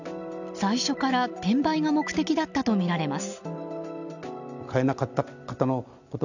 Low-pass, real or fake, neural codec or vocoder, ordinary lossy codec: 7.2 kHz; real; none; none